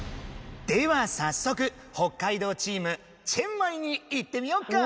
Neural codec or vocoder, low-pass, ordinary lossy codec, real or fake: none; none; none; real